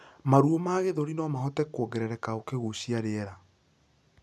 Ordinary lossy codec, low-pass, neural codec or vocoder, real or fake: none; none; none; real